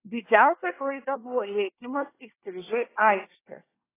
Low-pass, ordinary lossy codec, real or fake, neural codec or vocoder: 3.6 kHz; AAC, 16 kbps; fake; codec, 24 kHz, 1 kbps, SNAC